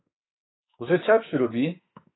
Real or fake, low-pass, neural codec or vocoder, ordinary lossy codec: fake; 7.2 kHz; autoencoder, 48 kHz, 32 numbers a frame, DAC-VAE, trained on Japanese speech; AAC, 16 kbps